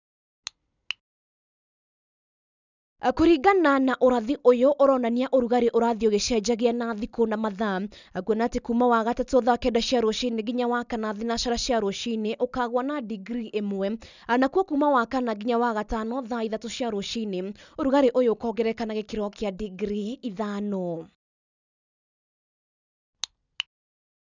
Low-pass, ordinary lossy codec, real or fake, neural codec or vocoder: 7.2 kHz; none; real; none